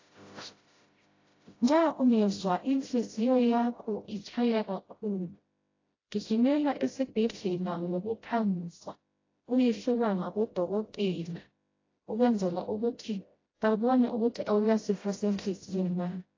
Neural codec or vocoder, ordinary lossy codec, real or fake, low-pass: codec, 16 kHz, 0.5 kbps, FreqCodec, smaller model; AAC, 32 kbps; fake; 7.2 kHz